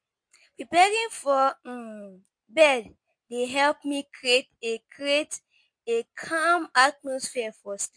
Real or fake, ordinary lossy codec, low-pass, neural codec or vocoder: real; MP3, 48 kbps; 9.9 kHz; none